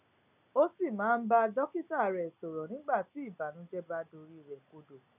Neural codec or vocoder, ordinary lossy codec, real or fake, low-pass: none; none; real; 3.6 kHz